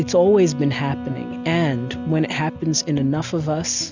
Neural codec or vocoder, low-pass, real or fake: none; 7.2 kHz; real